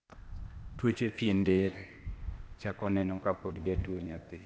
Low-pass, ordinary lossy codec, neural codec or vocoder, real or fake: none; none; codec, 16 kHz, 0.8 kbps, ZipCodec; fake